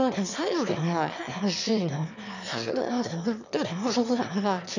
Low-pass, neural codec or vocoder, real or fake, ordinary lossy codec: 7.2 kHz; autoencoder, 22.05 kHz, a latent of 192 numbers a frame, VITS, trained on one speaker; fake; none